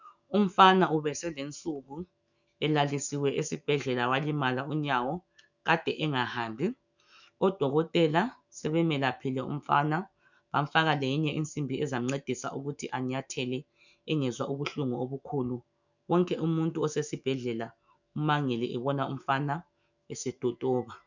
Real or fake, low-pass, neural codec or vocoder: fake; 7.2 kHz; autoencoder, 48 kHz, 128 numbers a frame, DAC-VAE, trained on Japanese speech